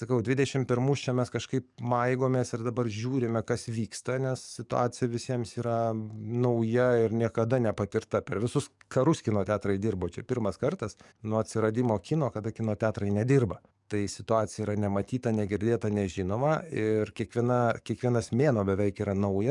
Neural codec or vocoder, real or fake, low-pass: codec, 44.1 kHz, 7.8 kbps, DAC; fake; 10.8 kHz